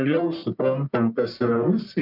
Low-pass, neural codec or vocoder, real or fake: 5.4 kHz; codec, 44.1 kHz, 1.7 kbps, Pupu-Codec; fake